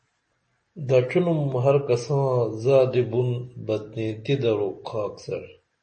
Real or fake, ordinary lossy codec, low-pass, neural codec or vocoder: real; MP3, 32 kbps; 10.8 kHz; none